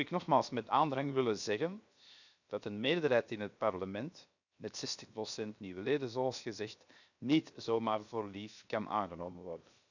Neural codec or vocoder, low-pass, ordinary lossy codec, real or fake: codec, 16 kHz, 0.7 kbps, FocalCodec; 7.2 kHz; none; fake